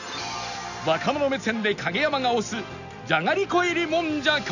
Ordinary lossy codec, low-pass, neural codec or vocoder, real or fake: none; 7.2 kHz; none; real